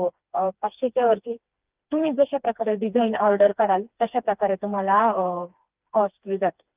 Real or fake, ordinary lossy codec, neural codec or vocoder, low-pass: fake; Opus, 24 kbps; codec, 16 kHz, 2 kbps, FreqCodec, smaller model; 3.6 kHz